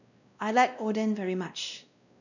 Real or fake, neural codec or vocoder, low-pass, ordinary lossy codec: fake; codec, 16 kHz, 1 kbps, X-Codec, WavLM features, trained on Multilingual LibriSpeech; 7.2 kHz; none